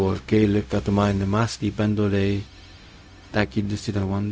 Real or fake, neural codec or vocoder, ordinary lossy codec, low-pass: fake; codec, 16 kHz, 0.4 kbps, LongCat-Audio-Codec; none; none